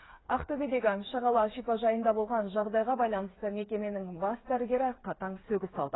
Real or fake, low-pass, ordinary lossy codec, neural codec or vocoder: fake; 7.2 kHz; AAC, 16 kbps; codec, 16 kHz, 4 kbps, FreqCodec, smaller model